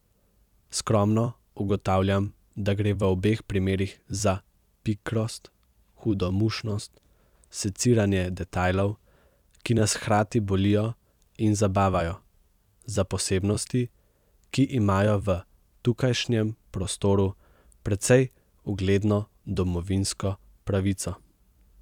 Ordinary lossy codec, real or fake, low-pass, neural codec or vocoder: none; fake; 19.8 kHz; vocoder, 44.1 kHz, 128 mel bands every 256 samples, BigVGAN v2